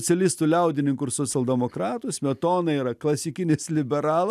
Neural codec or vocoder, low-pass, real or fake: none; 14.4 kHz; real